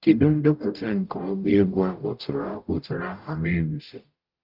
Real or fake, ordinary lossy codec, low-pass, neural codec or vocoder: fake; Opus, 24 kbps; 5.4 kHz; codec, 44.1 kHz, 0.9 kbps, DAC